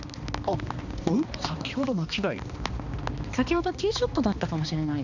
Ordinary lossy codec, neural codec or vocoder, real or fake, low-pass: none; codec, 16 kHz, 2 kbps, X-Codec, HuBERT features, trained on balanced general audio; fake; 7.2 kHz